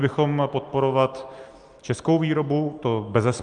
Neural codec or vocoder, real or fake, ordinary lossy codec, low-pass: none; real; Opus, 32 kbps; 9.9 kHz